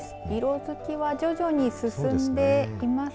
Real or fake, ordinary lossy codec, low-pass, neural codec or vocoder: real; none; none; none